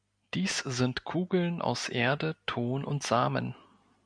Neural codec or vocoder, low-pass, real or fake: none; 9.9 kHz; real